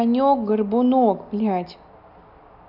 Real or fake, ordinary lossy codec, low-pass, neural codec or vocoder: real; none; 5.4 kHz; none